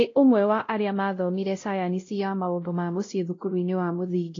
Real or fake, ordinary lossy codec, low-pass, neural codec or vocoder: fake; AAC, 32 kbps; 7.2 kHz; codec, 16 kHz, 0.5 kbps, X-Codec, WavLM features, trained on Multilingual LibriSpeech